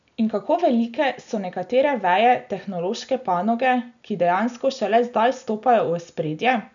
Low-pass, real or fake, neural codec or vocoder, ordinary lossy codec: 7.2 kHz; real; none; none